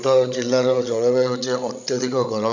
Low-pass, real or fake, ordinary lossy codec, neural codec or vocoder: 7.2 kHz; fake; none; codec, 16 kHz, 16 kbps, FreqCodec, larger model